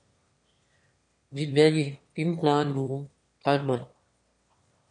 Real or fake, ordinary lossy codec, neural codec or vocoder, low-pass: fake; MP3, 48 kbps; autoencoder, 22.05 kHz, a latent of 192 numbers a frame, VITS, trained on one speaker; 9.9 kHz